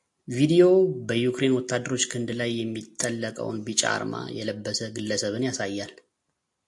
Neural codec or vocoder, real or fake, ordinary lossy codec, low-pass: none; real; AAC, 64 kbps; 10.8 kHz